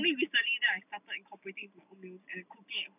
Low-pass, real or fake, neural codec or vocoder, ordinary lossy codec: 3.6 kHz; real; none; none